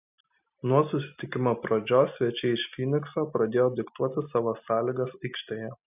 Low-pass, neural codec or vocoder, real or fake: 3.6 kHz; none; real